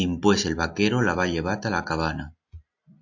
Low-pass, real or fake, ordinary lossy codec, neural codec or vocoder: 7.2 kHz; real; AAC, 48 kbps; none